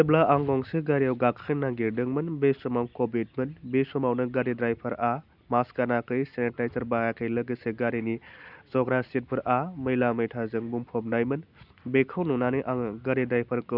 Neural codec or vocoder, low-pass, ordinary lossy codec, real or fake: none; 5.4 kHz; none; real